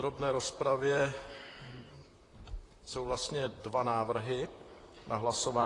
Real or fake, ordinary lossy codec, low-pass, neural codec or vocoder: fake; AAC, 32 kbps; 10.8 kHz; vocoder, 44.1 kHz, 128 mel bands, Pupu-Vocoder